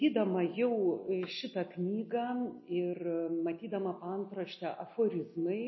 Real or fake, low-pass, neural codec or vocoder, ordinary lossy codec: real; 7.2 kHz; none; MP3, 24 kbps